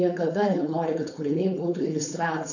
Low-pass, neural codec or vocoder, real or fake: 7.2 kHz; codec, 16 kHz, 4.8 kbps, FACodec; fake